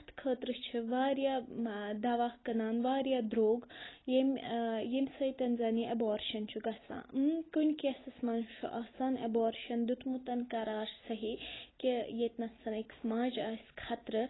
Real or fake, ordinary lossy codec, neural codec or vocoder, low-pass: real; AAC, 16 kbps; none; 7.2 kHz